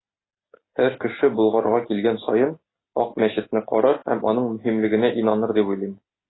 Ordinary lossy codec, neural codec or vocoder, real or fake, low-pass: AAC, 16 kbps; none; real; 7.2 kHz